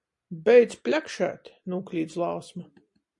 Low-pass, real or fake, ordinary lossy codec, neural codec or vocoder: 10.8 kHz; real; MP3, 48 kbps; none